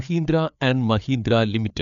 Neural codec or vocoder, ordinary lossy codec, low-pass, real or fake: codec, 16 kHz, 4 kbps, FreqCodec, larger model; none; 7.2 kHz; fake